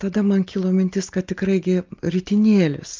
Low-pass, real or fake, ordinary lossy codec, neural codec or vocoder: 7.2 kHz; real; Opus, 24 kbps; none